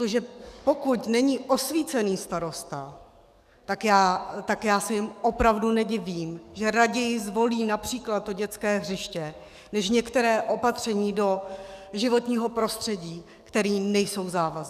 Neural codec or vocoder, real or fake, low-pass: codec, 44.1 kHz, 7.8 kbps, DAC; fake; 14.4 kHz